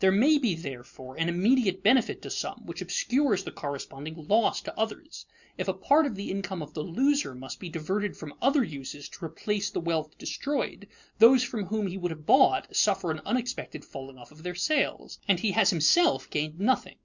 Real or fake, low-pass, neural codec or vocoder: real; 7.2 kHz; none